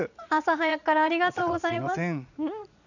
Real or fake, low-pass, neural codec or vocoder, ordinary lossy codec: fake; 7.2 kHz; vocoder, 44.1 kHz, 80 mel bands, Vocos; none